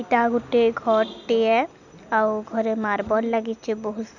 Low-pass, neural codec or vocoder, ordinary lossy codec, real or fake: 7.2 kHz; none; none; real